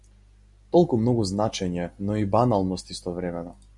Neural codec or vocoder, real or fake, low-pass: none; real; 10.8 kHz